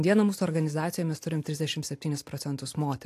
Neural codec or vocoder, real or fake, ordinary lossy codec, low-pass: none; real; AAC, 64 kbps; 14.4 kHz